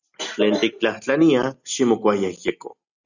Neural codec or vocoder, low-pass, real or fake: none; 7.2 kHz; real